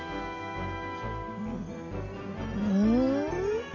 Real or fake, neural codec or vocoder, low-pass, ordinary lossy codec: real; none; 7.2 kHz; none